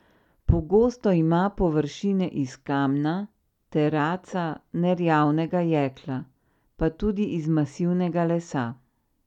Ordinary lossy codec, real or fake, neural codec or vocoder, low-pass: none; real; none; 19.8 kHz